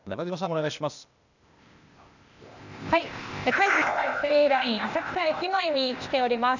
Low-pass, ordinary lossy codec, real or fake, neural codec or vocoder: 7.2 kHz; none; fake; codec, 16 kHz, 0.8 kbps, ZipCodec